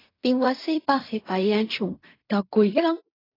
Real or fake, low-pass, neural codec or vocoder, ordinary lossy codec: fake; 5.4 kHz; codec, 16 kHz in and 24 kHz out, 0.4 kbps, LongCat-Audio-Codec, fine tuned four codebook decoder; AAC, 24 kbps